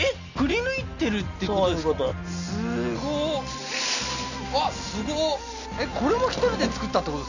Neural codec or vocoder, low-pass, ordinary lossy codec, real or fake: none; 7.2 kHz; none; real